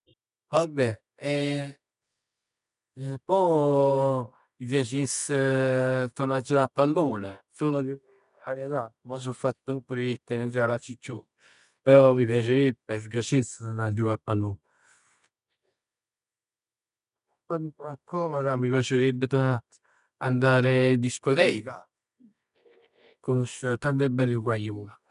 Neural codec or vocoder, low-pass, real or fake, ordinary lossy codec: codec, 24 kHz, 0.9 kbps, WavTokenizer, medium music audio release; 10.8 kHz; fake; none